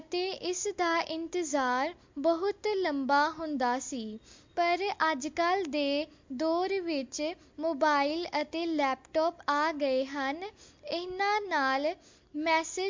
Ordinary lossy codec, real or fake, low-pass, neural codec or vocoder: MP3, 48 kbps; real; 7.2 kHz; none